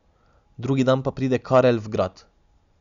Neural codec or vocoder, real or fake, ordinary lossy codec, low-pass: none; real; Opus, 64 kbps; 7.2 kHz